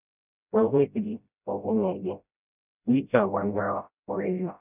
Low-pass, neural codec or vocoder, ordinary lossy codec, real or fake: 3.6 kHz; codec, 16 kHz, 0.5 kbps, FreqCodec, smaller model; none; fake